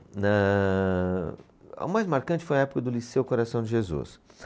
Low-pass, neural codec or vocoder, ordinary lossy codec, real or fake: none; none; none; real